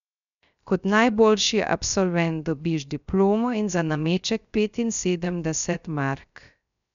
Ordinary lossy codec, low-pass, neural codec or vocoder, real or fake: none; 7.2 kHz; codec, 16 kHz, 0.3 kbps, FocalCodec; fake